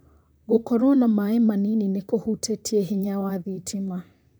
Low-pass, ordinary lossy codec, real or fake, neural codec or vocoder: none; none; fake; vocoder, 44.1 kHz, 128 mel bands, Pupu-Vocoder